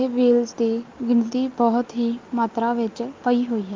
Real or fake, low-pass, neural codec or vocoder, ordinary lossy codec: real; 7.2 kHz; none; Opus, 32 kbps